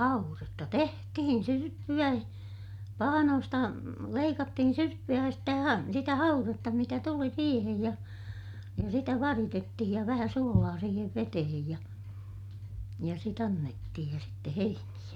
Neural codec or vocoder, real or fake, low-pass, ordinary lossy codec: none; real; 19.8 kHz; none